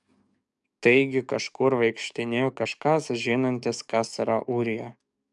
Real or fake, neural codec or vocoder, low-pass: fake; codec, 44.1 kHz, 7.8 kbps, DAC; 10.8 kHz